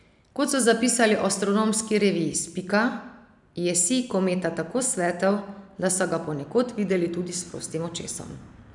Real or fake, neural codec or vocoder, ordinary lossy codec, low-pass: real; none; none; 10.8 kHz